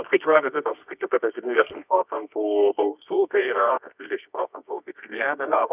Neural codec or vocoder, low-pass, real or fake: codec, 24 kHz, 0.9 kbps, WavTokenizer, medium music audio release; 3.6 kHz; fake